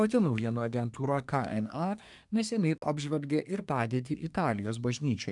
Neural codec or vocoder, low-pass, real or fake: codec, 24 kHz, 1 kbps, SNAC; 10.8 kHz; fake